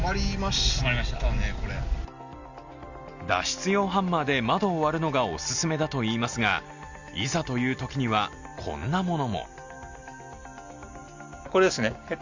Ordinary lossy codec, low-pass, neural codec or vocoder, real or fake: Opus, 64 kbps; 7.2 kHz; none; real